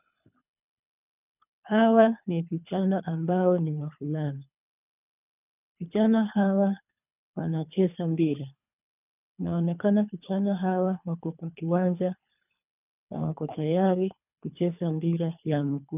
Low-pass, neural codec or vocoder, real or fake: 3.6 kHz; codec, 24 kHz, 3 kbps, HILCodec; fake